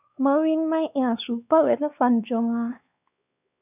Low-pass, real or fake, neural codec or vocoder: 3.6 kHz; fake; codec, 16 kHz, 2 kbps, X-Codec, WavLM features, trained on Multilingual LibriSpeech